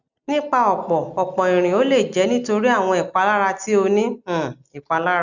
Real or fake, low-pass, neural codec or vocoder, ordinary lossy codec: real; 7.2 kHz; none; none